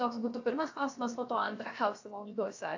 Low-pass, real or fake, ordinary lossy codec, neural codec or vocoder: 7.2 kHz; fake; AAC, 48 kbps; codec, 16 kHz, about 1 kbps, DyCAST, with the encoder's durations